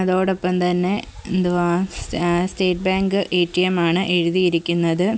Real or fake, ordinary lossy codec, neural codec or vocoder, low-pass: real; none; none; none